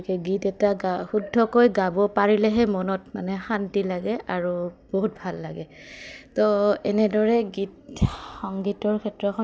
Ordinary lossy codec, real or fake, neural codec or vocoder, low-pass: none; real; none; none